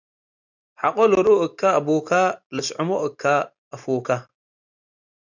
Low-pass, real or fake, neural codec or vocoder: 7.2 kHz; real; none